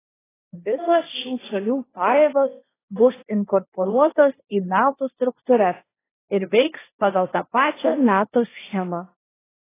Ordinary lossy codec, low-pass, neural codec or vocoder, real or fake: AAC, 16 kbps; 3.6 kHz; codec, 16 kHz, 1.1 kbps, Voila-Tokenizer; fake